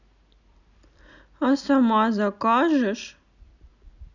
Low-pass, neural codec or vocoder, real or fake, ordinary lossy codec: 7.2 kHz; none; real; none